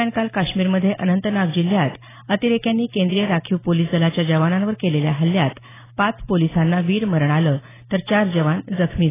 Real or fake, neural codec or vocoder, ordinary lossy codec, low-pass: real; none; AAC, 16 kbps; 3.6 kHz